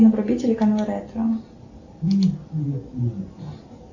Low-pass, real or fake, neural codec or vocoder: 7.2 kHz; real; none